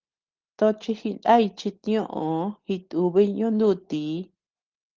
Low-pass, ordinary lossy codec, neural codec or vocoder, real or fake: 7.2 kHz; Opus, 16 kbps; none; real